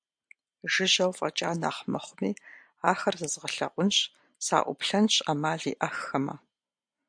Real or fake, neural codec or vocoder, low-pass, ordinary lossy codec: real; none; 9.9 kHz; MP3, 64 kbps